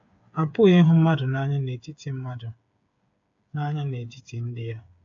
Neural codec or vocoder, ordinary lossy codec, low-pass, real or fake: codec, 16 kHz, 8 kbps, FreqCodec, smaller model; AAC, 64 kbps; 7.2 kHz; fake